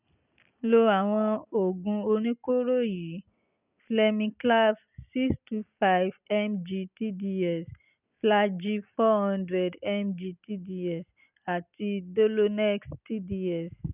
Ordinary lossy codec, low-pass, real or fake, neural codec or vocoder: none; 3.6 kHz; real; none